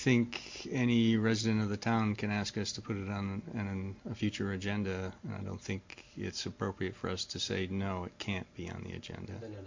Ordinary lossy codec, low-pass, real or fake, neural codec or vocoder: MP3, 48 kbps; 7.2 kHz; real; none